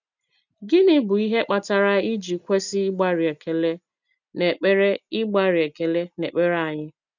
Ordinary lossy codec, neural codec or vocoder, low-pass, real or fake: none; none; 7.2 kHz; real